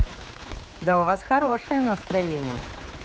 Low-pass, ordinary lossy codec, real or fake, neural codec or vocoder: none; none; fake; codec, 16 kHz, 4 kbps, X-Codec, HuBERT features, trained on general audio